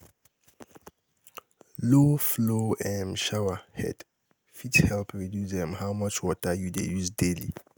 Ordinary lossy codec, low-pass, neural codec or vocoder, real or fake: none; none; none; real